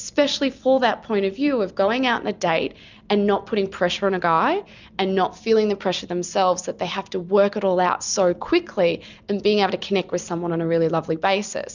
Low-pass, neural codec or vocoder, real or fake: 7.2 kHz; none; real